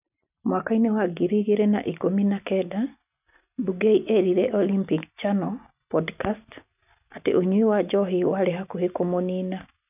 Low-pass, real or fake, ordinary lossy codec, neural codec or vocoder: 3.6 kHz; real; none; none